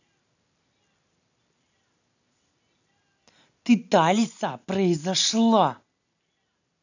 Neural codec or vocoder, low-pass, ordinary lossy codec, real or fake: none; 7.2 kHz; none; real